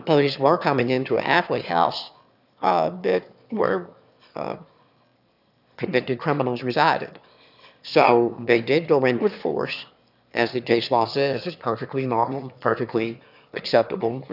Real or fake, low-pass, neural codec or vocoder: fake; 5.4 kHz; autoencoder, 22.05 kHz, a latent of 192 numbers a frame, VITS, trained on one speaker